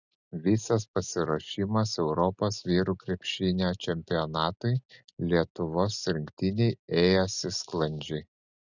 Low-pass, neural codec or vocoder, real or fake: 7.2 kHz; none; real